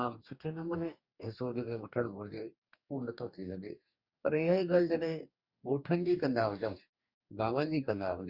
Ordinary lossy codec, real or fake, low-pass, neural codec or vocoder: Opus, 64 kbps; fake; 5.4 kHz; codec, 44.1 kHz, 2.6 kbps, DAC